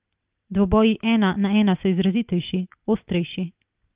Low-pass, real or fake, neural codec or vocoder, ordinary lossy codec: 3.6 kHz; real; none; Opus, 32 kbps